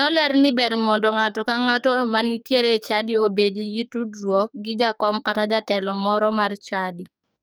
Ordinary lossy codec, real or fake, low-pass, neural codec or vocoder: none; fake; none; codec, 44.1 kHz, 2.6 kbps, SNAC